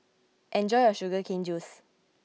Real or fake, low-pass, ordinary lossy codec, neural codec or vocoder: real; none; none; none